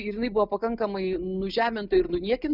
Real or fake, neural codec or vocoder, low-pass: real; none; 5.4 kHz